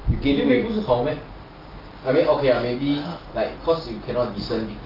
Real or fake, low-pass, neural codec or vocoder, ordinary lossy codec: real; 5.4 kHz; none; Opus, 24 kbps